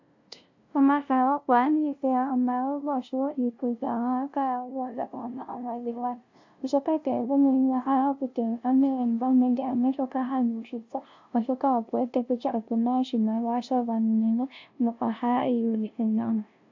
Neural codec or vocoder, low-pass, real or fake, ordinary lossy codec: codec, 16 kHz, 0.5 kbps, FunCodec, trained on LibriTTS, 25 frames a second; 7.2 kHz; fake; AAC, 48 kbps